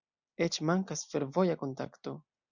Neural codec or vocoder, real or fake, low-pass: none; real; 7.2 kHz